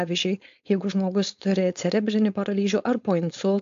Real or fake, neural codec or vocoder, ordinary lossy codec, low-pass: fake; codec, 16 kHz, 4.8 kbps, FACodec; AAC, 64 kbps; 7.2 kHz